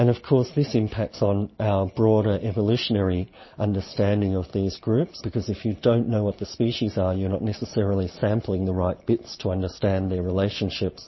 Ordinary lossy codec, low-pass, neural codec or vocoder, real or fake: MP3, 24 kbps; 7.2 kHz; none; real